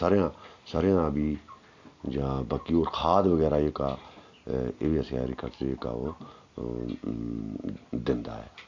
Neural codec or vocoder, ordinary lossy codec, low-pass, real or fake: none; MP3, 64 kbps; 7.2 kHz; real